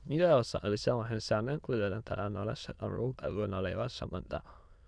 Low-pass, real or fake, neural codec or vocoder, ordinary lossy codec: 9.9 kHz; fake; autoencoder, 22.05 kHz, a latent of 192 numbers a frame, VITS, trained on many speakers; none